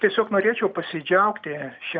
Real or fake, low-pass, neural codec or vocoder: real; 7.2 kHz; none